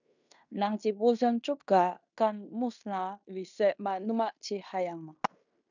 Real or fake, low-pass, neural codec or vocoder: fake; 7.2 kHz; codec, 16 kHz in and 24 kHz out, 0.9 kbps, LongCat-Audio-Codec, fine tuned four codebook decoder